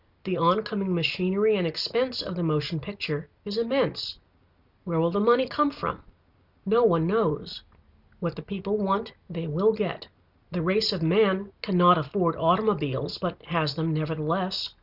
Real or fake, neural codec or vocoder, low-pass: real; none; 5.4 kHz